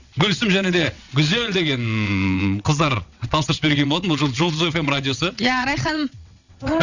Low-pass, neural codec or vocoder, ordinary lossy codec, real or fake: 7.2 kHz; vocoder, 22.05 kHz, 80 mel bands, WaveNeXt; none; fake